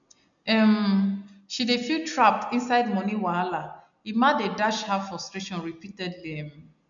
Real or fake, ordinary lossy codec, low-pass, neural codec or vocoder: real; none; 7.2 kHz; none